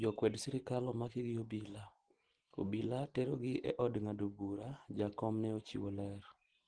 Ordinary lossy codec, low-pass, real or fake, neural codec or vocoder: Opus, 16 kbps; 9.9 kHz; real; none